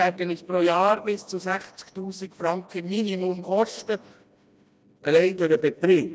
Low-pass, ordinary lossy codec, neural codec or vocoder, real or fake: none; none; codec, 16 kHz, 1 kbps, FreqCodec, smaller model; fake